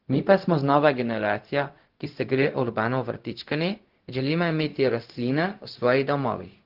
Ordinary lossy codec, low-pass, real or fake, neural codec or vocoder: Opus, 16 kbps; 5.4 kHz; fake; codec, 16 kHz, 0.4 kbps, LongCat-Audio-Codec